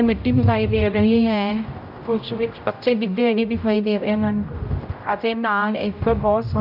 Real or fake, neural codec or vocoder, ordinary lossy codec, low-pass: fake; codec, 16 kHz, 0.5 kbps, X-Codec, HuBERT features, trained on general audio; none; 5.4 kHz